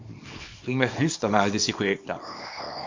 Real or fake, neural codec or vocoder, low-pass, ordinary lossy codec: fake; codec, 24 kHz, 0.9 kbps, WavTokenizer, small release; 7.2 kHz; MP3, 48 kbps